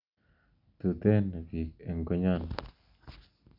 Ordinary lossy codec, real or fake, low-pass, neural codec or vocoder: none; real; 5.4 kHz; none